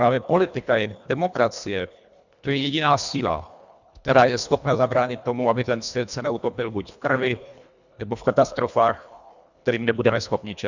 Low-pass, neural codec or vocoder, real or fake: 7.2 kHz; codec, 24 kHz, 1.5 kbps, HILCodec; fake